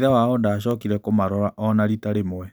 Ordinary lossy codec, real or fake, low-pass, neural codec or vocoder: none; real; none; none